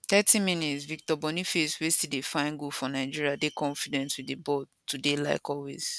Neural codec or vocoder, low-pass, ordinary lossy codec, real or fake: none; none; none; real